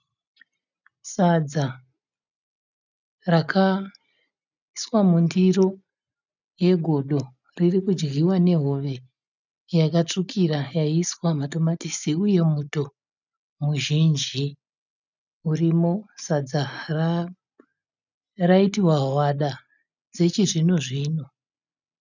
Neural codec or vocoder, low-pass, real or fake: none; 7.2 kHz; real